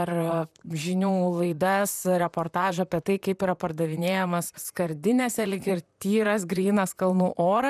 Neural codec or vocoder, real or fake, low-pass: vocoder, 44.1 kHz, 128 mel bands, Pupu-Vocoder; fake; 14.4 kHz